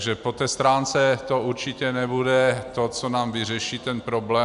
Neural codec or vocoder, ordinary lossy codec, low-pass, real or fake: none; AAC, 96 kbps; 10.8 kHz; real